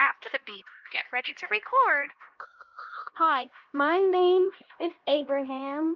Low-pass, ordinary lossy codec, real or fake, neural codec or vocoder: 7.2 kHz; Opus, 32 kbps; fake; codec, 16 kHz, 1 kbps, X-Codec, HuBERT features, trained on LibriSpeech